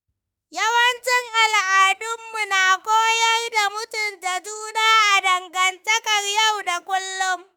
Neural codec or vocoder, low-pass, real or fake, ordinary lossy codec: autoencoder, 48 kHz, 32 numbers a frame, DAC-VAE, trained on Japanese speech; none; fake; none